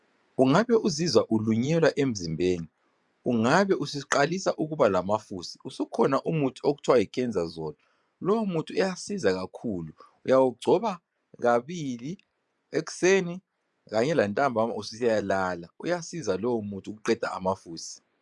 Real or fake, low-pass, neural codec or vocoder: fake; 10.8 kHz; vocoder, 24 kHz, 100 mel bands, Vocos